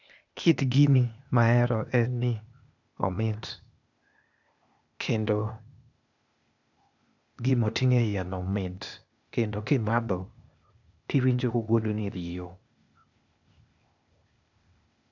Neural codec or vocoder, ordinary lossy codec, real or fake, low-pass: codec, 16 kHz, 0.8 kbps, ZipCodec; none; fake; 7.2 kHz